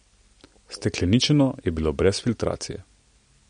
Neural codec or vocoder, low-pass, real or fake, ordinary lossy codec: none; 9.9 kHz; real; MP3, 48 kbps